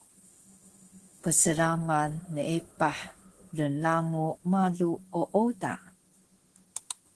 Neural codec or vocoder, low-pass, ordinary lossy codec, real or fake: autoencoder, 48 kHz, 32 numbers a frame, DAC-VAE, trained on Japanese speech; 10.8 kHz; Opus, 16 kbps; fake